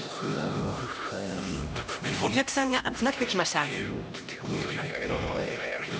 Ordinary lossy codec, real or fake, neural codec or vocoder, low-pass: none; fake; codec, 16 kHz, 1 kbps, X-Codec, HuBERT features, trained on LibriSpeech; none